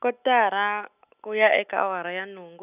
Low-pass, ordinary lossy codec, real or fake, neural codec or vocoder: 3.6 kHz; none; real; none